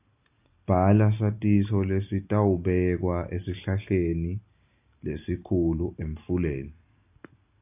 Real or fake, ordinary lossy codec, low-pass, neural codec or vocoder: real; AAC, 32 kbps; 3.6 kHz; none